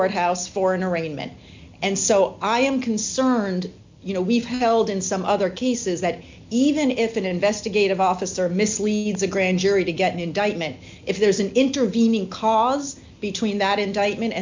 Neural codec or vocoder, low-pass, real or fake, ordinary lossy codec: none; 7.2 kHz; real; MP3, 64 kbps